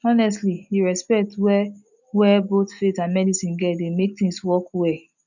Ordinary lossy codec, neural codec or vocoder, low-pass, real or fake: none; none; 7.2 kHz; real